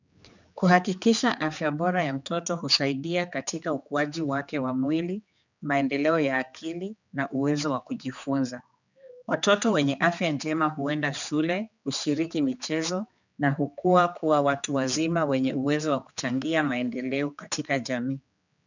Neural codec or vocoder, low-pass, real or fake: codec, 16 kHz, 4 kbps, X-Codec, HuBERT features, trained on general audio; 7.2 kHz; fake